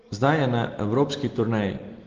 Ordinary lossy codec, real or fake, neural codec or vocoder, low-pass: Opus, 16 kbps; real; none; 7.2 kHz